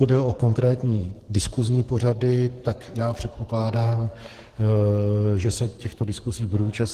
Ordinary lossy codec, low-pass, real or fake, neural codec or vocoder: Opus, 16 kbps; 14.4 kHz; fake; codec, 44.1 kHz, 2.6 kbps, SNAC